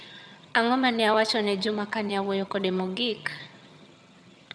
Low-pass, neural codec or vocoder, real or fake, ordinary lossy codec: none; vocoder, 22.05 kHz, 80 mel bands, HiFi-GAN; fake; none